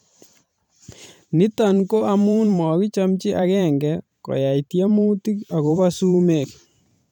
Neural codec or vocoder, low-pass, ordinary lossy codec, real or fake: vocoder, 44.1 kHz, 128 mel bands every 512 samples, BigVGAN v2; 19.8 kHz; none; fake